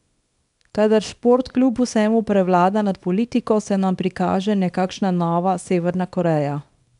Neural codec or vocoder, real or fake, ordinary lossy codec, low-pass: codec, 24 kHz, 0.9 kbps, WavTokenizer, small release; fake; none; 10.8 kHz